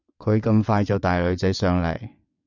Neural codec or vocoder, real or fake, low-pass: vocoder, 22.05 kHz, 80 mel bands, WaveNeXt; fake; 7.2 kHz